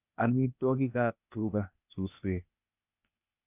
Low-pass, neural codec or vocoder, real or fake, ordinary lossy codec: 3.6 kHz; codec, 16 kHz, 0.8 kbps, ZipCodec; fake; none